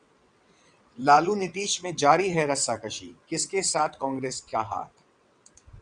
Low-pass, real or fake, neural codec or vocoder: 9.9 kHz; fake; vocoder, 22.05 kHz, 80 mel bands, WaveNeXt